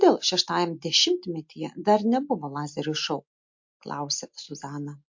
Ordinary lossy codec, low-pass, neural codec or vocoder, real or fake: MP3, 48 kbps; 7.2 kHz; none; real